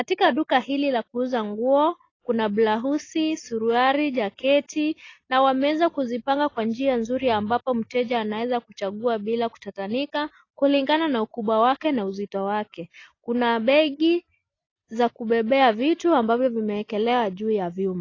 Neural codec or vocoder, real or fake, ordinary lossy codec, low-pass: none; real; AAC, 32 kbps; 7.2 kHz